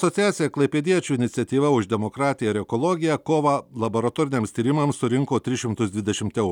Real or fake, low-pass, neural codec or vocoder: real; 19.8 kHz; none